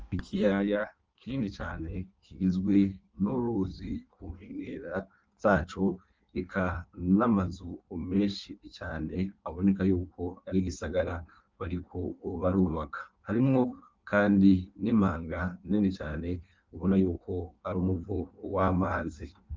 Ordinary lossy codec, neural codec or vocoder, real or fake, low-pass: Opus, 24 kbps; codec, 16 kHz in and 24 kHz out, 1.1 kbps, FireRedTTS-2 codec; fake; 7.2 kHz